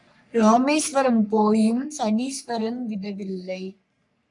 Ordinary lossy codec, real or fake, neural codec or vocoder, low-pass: MP3, 96 kbps; fake; codec, 44.1 kHz, 3.4 kbps, Pupu-Codec; 10.8 kHz